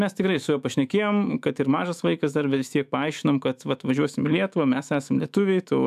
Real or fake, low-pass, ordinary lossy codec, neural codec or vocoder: fake; 14.4 kHz; AAC, 96 kbps; autoencoder, 48 kHz, 128 numbers a frame, DAC-VAE, trained on Japanese speech